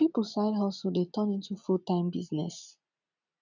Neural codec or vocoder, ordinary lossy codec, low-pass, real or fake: none; none; 7.2 kHz; real